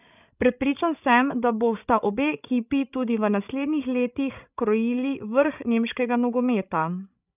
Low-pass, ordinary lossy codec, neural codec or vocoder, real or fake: 3.6 kHz; none; codec, 16 kHz, 16 kbps, FreqCodec, larger model; fake